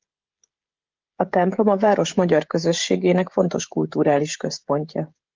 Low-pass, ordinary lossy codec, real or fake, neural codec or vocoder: 7.2 kHz; Opus, 32 kbps; fake; codec, 16 kHz, 16 kbps, FreqCodec, smaller model